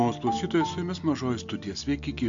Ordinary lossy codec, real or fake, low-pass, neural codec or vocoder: AAC, 64 kbps; real; 7.2 kHz; none